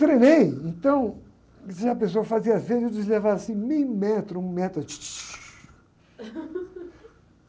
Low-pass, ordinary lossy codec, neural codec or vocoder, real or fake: none; none; none; real